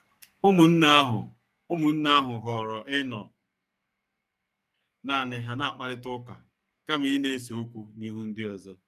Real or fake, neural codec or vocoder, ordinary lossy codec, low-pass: fake; codec, 44.1 kHz, 2.6 kbps, SNAC; none; 14.4 kHz